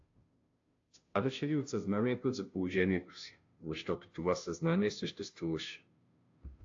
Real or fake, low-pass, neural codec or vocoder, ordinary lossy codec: fake; 7.2 kHz; codec, 16 kHz, 0.5 kbps, FunCodec, trained on Chinese and English, 25 frames a second; AAC, 48 kbps